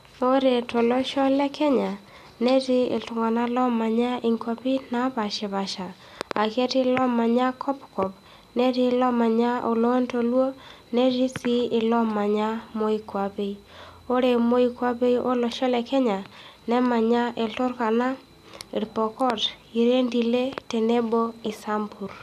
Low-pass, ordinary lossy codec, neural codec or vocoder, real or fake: 14.4 kHz; none; none; real